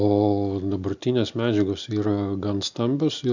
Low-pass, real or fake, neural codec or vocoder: 7.2 kHz; real; none